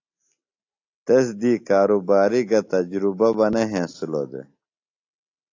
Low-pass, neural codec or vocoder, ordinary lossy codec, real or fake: 7.2 kHz; none; AAC, 48 kbps; real